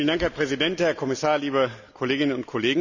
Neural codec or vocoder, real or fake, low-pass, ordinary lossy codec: none; real; 7.2 kHz; none